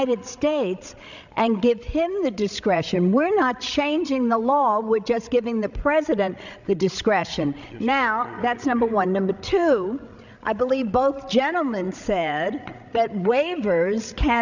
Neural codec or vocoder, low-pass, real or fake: codec, 16 kHz, 16 kbps, FreqCodec, larger model; 7.2 kHz; fake